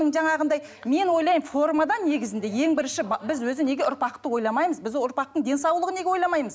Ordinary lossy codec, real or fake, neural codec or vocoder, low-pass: none; real; none; none